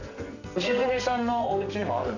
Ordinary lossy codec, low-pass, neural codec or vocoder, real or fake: none; 7.2 kHz; codec, 44.1 kHz, 2.6 kbps, SNAC; fake